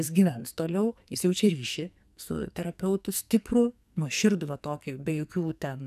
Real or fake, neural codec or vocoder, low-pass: fake; codec, 44.1 kHz, 2.6 kbps, SNAC; 14.4 kHz